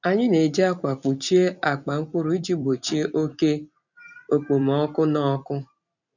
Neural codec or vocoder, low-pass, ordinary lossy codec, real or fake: vocoder, 44.1 kHz, 128 mel bands every 256 samples, BigVGAN v2; 7.2 kHz; none; fake